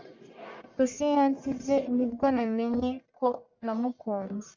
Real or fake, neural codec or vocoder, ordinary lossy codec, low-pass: fake; codec, 44.1 kHz, 1.7 kbps, Pupu-Codec; MP3, 64 kbps; 7.2 kHz